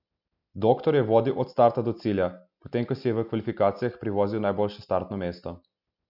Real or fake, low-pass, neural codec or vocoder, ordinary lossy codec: real; 5.4 kHz; none; none